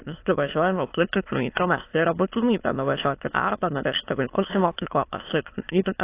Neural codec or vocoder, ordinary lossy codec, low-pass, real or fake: autoencoder, 22.05 kHz, a latent of 192 numbers a frame, VITS, trained on many speakers; AAC, 24 kbps; 3.6 kHz; fake